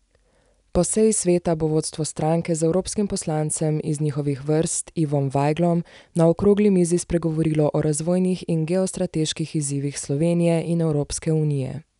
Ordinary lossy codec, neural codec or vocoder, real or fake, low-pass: none; none; real; 10.8 kHz